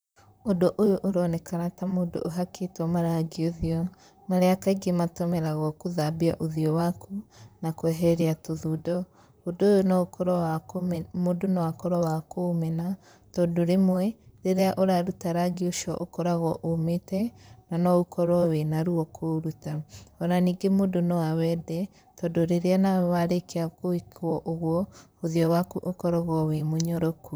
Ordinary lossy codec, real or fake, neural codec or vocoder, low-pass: none; fake; vocoder, 44.1 kHz, 128 mel bands, Pupu-Vocoder; none